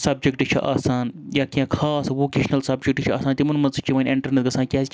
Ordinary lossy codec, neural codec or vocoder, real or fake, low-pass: none; none; real; none